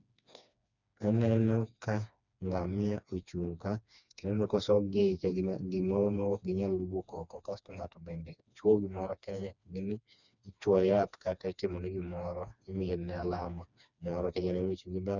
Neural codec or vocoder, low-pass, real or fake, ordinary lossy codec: codec, 16 kHz, 2 kbps, FreqCodec, smaller model; 7.2 kHz; fake; none